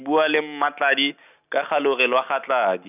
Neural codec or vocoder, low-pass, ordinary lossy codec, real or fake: none; 3.6 kHz; none; real